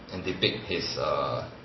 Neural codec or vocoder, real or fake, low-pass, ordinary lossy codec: none; real; 7.2 kHz; MP3, 24 kbps